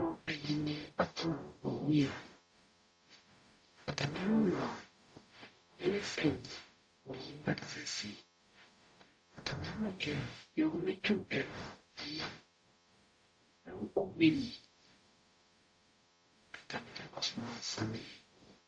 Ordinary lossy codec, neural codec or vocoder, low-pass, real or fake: AAC, 64 kbps; codec, 44.1 kHz, 0.9 kbps, DAC; 9.9 kHz; fake